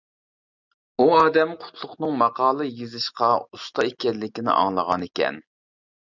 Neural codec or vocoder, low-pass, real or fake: none; 7.2 kHz; real